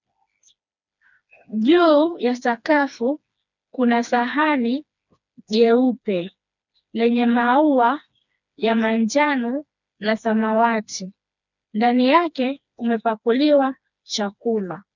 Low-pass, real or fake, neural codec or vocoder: 7.2 kHz; fake; codec, 16 kHz, 2 kbps, FreqCodec, smaller model